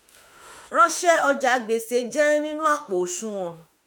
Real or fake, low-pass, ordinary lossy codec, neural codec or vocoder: fake; none; none; autoencoder, 48 kHz, 32 numbers a frame, DAC-VAE, trained on Japanese speech